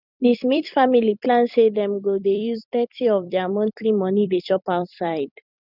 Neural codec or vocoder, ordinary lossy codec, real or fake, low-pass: vocoder, 44.1 kHz, 128 mel bands every 256 samples, BigVGAN v2; none; fake; 5.4 kHz